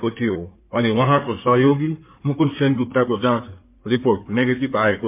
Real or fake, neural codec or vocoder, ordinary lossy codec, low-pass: fake; codec, 16 kHz in and 24 kHz out, 2.2 kbps, FireRedTTS-2 codec; MP3, 32 kbps; 3.6 kHz